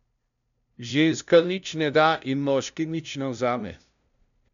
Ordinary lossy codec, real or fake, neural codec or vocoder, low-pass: none; fake; codec, 16 kHz, 0.5 kbps, FunCodec, trained on LibriTTS, 25 frames a second; 7.2 kHz